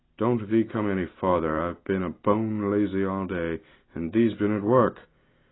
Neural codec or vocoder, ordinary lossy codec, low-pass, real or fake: none; AAC, 16 kbps; 7.2 kHz; real